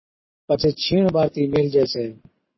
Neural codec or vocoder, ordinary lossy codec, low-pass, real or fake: none; MP3, 24 kbps; 7.2 kHz; real